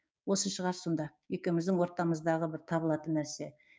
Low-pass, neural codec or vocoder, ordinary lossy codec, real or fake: none; codec, 16 kHz, 6 kbps, DAC; none; fake